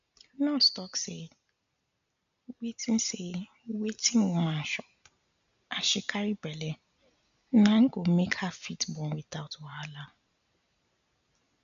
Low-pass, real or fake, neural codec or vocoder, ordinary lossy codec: 7.2 kHz; real; none; none